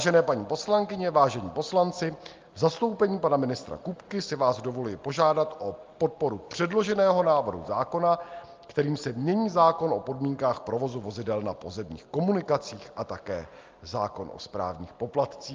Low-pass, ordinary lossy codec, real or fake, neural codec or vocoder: 7.2 kHz; Opus, 32 kbps; real; none